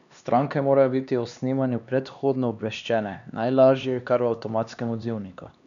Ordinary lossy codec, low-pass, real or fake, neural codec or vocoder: none; 7.2 kHz; fake; codec, 16 kHz, 2 kbps, X-Codec, HuBERT features, trained on LibriSpeech